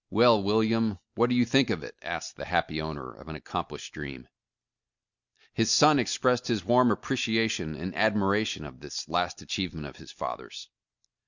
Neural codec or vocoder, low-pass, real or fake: none; 7.2 kHz; real